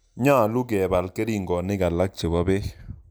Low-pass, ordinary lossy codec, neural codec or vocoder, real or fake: none; none; none; real